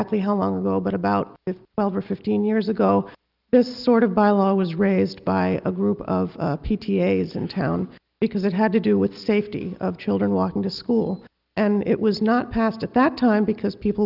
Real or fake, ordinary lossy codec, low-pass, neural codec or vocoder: real; Opus, 32 kbps; 5.4 kHz; none